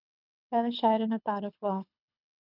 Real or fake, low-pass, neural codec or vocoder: fake; 5.4 kHz; codec, 24 kHz, 6 kbps, HILCodec